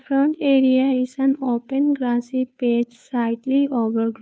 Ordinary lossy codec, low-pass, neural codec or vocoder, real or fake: none; none; codec, 16 kHz, 2 kbps, FunCodec, trained on Chinese and English, 25 frames a second; fake